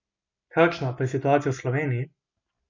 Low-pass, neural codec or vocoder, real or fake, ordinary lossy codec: 7.2 kHz; none; real; none